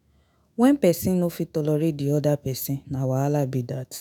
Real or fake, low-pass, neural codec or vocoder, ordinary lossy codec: fake; none; autoencoder, 48 kHz, 128 numbers a frame, DAC-VAE, trained on Japanese speech; none